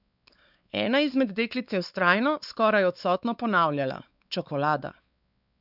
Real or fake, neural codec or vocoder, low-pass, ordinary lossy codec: fake; codec, 16 kHz, 4 kbps, X-Codec, WavLM features, trained on Multilingual LibriSpeech; 5.4 kHz; none